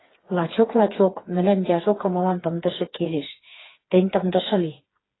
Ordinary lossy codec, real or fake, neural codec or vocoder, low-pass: AAC, 16 kbps; fake; codec, 16 kHz, 4 kbps, FreqCodec, smaller model; 7.2 kHz